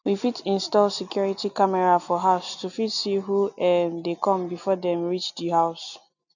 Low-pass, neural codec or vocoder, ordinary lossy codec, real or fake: 7.2 kHz; none; none; real